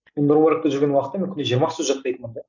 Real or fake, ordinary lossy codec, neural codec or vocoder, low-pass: real; none; none; 7.2 kHz